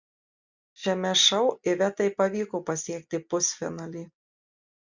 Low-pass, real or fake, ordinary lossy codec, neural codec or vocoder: 7.2 kHz; real; Opus, 64 kbps; none